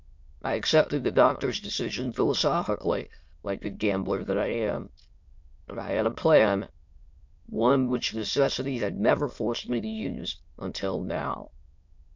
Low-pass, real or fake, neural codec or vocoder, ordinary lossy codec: 7.2 kHz; fake; autoencoder, 22.05 kHz, a latent of 192 numbers a frame, VITS, trained on many speakers; MP3, 48 kbps